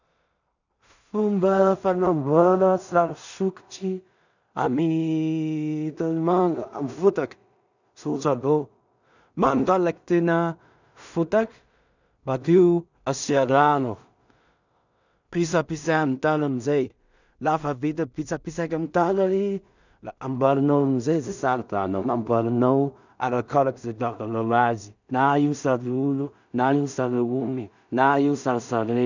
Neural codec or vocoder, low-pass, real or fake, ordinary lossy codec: codec, 16 kHz in and 24 kHz out, 0.4 kbps, LongCat-Audio-Codec, two codebook decoder; 7.2 kHz; fake; none